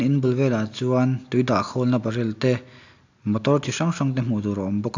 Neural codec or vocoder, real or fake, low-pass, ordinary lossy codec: none; real; 7.2 kHz; AAC, 48 kbps